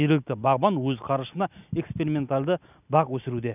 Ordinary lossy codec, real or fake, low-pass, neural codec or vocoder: none; real; 3.6 kHz; none